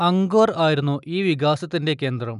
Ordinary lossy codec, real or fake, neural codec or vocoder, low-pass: none; real; none; 10.8 kHz